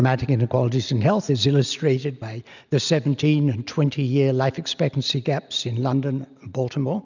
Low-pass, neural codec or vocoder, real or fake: 7.2 kHz; none; real